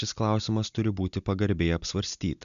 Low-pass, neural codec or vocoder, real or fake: 7.2 kHz; none; real